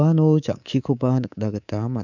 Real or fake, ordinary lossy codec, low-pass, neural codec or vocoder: fake; none; 7.2 kHz; codec, 24 kHz, 3.1 kbps, DualCodec